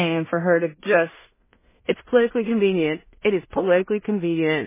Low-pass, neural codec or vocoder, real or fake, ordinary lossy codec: 3.6 kHz; codec, 16 kHz in and 24 kHz out, 0.4 kbps, LongCat-Audio-Codec, two codebook decoder; fake; MP3, 16 kbps